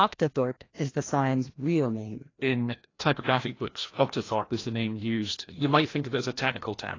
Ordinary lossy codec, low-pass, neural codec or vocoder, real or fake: AAC, 32 kbps; 7.2 kHz; codec, 16 kHz, 1 kbps, FreqCodec, larger model; fake